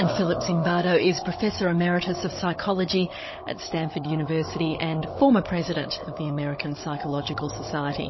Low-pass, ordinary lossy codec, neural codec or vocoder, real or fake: 7.2 kHz; MP3, 24 kbps; codec, 16 kHz, 16 kbps, FunCodec, trained on Chinese and English, 50 frames a second; fake